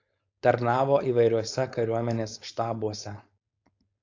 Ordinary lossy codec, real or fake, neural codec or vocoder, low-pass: AAC, 48 kbps; fake; codec, 16 kHz, 4.8 kbps, FACodec; 7.2 kHz